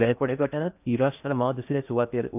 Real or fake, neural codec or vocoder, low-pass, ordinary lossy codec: fake; codec, 16 kHz in and 24 kHz out, 0.6 kbps, FocalCodec, streaming, 4096 codes; 3.6 kHz; none